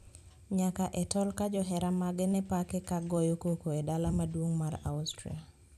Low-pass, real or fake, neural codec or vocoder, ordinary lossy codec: 14.4 kHz; fake; vocoder, 44.1 kHz, 128 mel bands every 256 samples, BigVGAN v2; none